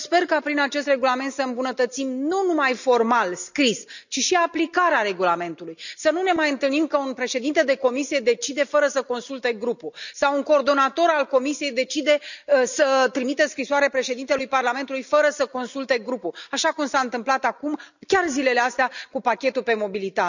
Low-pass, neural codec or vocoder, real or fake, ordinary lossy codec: 7.2 kHz; none; real; none